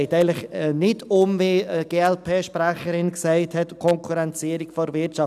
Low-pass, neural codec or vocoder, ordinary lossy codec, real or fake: 14.4 kHz; none; none; real